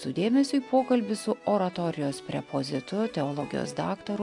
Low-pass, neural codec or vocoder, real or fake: 10.8 kHz; none; real